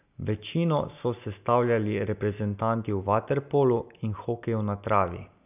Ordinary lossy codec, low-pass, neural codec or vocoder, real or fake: none; 3.6 kHz; none; real